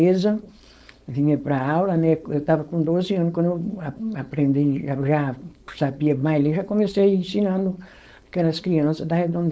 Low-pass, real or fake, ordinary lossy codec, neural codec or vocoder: none; fake; none; codec, 16 kHz, 4.8 kbps, FACodec